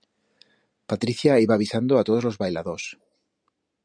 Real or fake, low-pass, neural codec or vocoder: real; 9.9 kHz; none